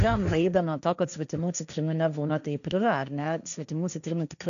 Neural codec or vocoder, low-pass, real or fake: codec, 16 kHz, 1.1 kbps, Voila-Tokenizer; 7.2 kHz; fake